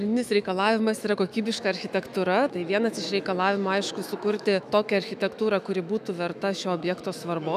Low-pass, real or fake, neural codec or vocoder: 14.4 kHz; fake; autoencoder, 48 kHz, 128 numbers a frame, DAC-VAE, trained on Japanese speech